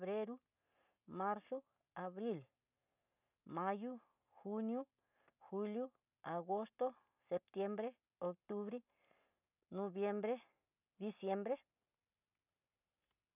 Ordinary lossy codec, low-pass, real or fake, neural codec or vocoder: none; 3.6 kHz; real; none